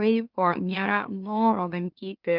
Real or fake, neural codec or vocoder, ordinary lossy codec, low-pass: fake; autoencoder, 44.1 kHz, a latent of 192 numbers a frame, MeloTTS; Opus, 32 kbps; 5.4 kHz